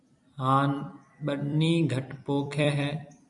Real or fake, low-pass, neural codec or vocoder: fake; 10.8 kHz; vocoder, 44.1 kHz, 128 mel bands every 256 samples, BigVGAN v2